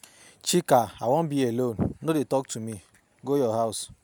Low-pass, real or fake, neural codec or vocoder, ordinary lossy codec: none; real; none; none